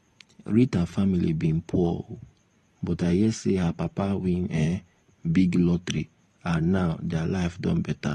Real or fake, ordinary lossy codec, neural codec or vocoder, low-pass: fake; AAC, 32 kbps; vocoder, 44.1 kHz, 128 mel bands every 512 samples, BigVGAN v2; 19.8 kHz